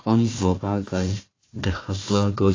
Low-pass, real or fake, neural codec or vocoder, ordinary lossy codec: 7.2 kHz; fake; codec, 16 kHz, 1 kbps, FunCodec, trained on Chinese and English, 50 frames a second; AAC, 32 kbps